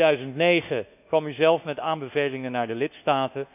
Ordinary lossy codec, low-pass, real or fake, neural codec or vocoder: none; 3.6 kHz; fake; codec, 24 kHz, 1.2 kbps, DualCodec